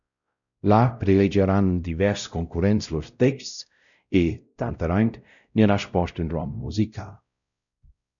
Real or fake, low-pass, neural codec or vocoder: fake; 7.2 kHz; codec, 16 kHz, 0.5 kbps, X-Codec, WavLM features, trained on Multilingual LibriSpeech